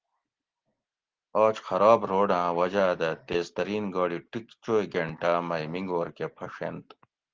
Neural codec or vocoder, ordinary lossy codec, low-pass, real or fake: none; Opus, 16 kbps; 7.2 kHz; real